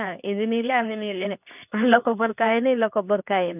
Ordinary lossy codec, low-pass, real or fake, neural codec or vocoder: none; 3.6 kHz; fake; codec, 24 kHz, 0.9 kbps, WavTokenizer, medium speech release version 2